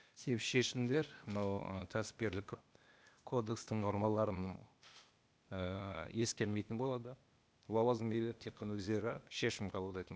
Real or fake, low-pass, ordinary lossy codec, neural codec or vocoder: fake; none; none; codec, 16 kHz, 0.8 kbps, ZipCodec